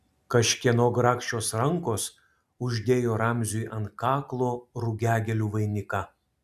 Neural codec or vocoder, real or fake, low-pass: none; real; 14.4 kHz